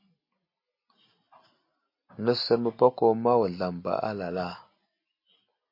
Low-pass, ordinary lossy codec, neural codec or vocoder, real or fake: 5.4 kHz; MP3, 32 kbps; none; real